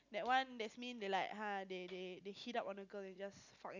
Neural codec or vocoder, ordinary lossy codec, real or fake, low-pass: none; none; real; 7.2 kHz